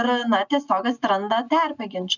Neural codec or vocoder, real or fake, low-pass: none; real; 7.2 kHz